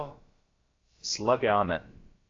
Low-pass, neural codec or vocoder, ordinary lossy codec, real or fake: 7.2 kHz; codec, 16 kHz, about 1 kbps, DyCAST, with the encoder's durations; AAC, 32 kbps; fake